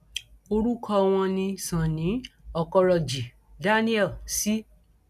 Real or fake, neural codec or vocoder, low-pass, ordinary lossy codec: real; none; 14.4 kHz; none